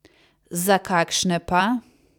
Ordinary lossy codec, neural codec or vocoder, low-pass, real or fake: none; vocoder, 44.1 kHz, 128 mel bands every 256 samples, BigVGAN v2; 19.8 kHz; fake